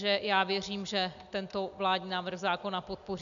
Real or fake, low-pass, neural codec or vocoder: real; 7.2 kHz; none